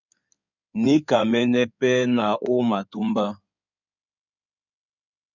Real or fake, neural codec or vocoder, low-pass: fake; codec, 16 kHz in and 24 kHz out, 2.2 kbps, FireRedTTS-2 codec; 7.2 kHz